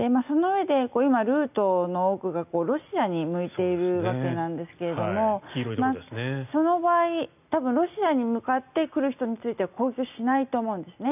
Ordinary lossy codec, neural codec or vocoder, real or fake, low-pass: none; none; real; 3.6 kHz